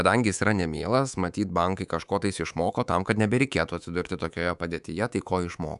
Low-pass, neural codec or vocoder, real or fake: 10.8 kHz; codec, 24 kHz, 3.1 kbps, DualCodec; fake